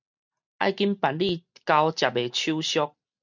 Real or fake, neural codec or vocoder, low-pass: real; none; 7.2 kHz